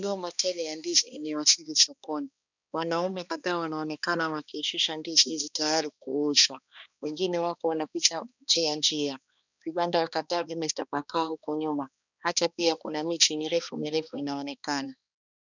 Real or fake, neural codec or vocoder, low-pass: fake; codec, 16 kHz, 2 kbps, X-Codec, HuBERT features, trained on balanced general audio; 7.2 kHz